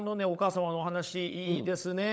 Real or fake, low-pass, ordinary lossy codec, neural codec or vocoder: fake; none; none; codec, 16 kHz, 16 kbps, FunCodec, trained on LibriTTS, 50 frames a second